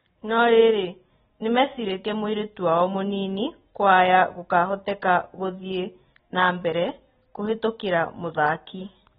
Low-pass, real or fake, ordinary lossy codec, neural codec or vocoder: 10.8 kHz; real; AAC, 16 kbps; none